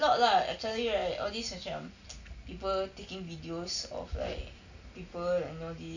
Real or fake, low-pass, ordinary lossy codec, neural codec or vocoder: real; 7.2 kHz; none; none